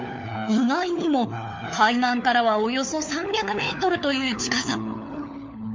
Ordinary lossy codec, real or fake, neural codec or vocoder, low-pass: MP3, 64 kbps; fake; codec, 16 kHz, 4 kbps, FunCodec, trained on LibriTTS, 50 frames a second; 7.2 kHz